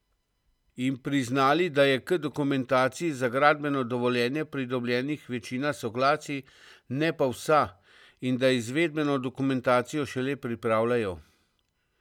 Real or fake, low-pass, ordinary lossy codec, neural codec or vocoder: real; 19.8 kHz; none; none